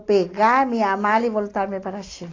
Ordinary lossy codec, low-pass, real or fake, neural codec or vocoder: AAC, 32 kbps; 7.2 kHz; real; none